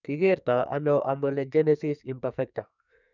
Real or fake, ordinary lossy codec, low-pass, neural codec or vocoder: fake; none; 7.2 kHz; codec, 44.1 kHz, 2.6 kbps, SNAC